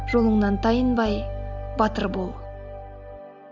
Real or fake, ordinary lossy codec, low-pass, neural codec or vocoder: real; none; 7.2 kHz; none